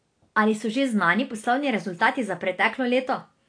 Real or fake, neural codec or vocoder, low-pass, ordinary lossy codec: real; none; 9.9 kHz; AAC, 48 kbps